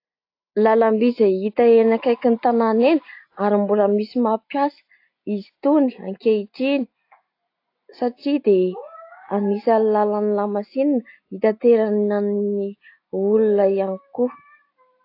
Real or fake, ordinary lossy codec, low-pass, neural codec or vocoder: real; AAC, 32 kbps; 5.4 kHz; none